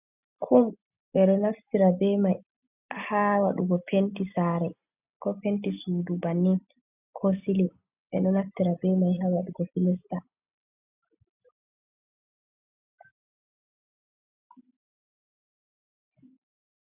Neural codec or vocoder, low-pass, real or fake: none; 3.6 kHz; real